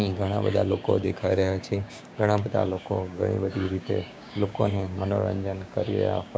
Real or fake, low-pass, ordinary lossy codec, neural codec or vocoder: fake; none; none; codec, 16 kHz, 6 kbps, DAC